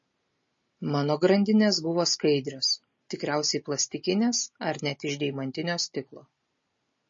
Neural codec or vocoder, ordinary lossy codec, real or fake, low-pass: none; MP3, 32 kbps; real; 7.2 kHz